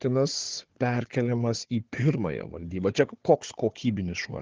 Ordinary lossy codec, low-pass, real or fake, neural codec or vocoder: Opus, 16 kbps; 7.2 kHz; fake; codec, 16 kHz, 4 kbps, FunCodec, trained on Chinese and English, 50 frames a second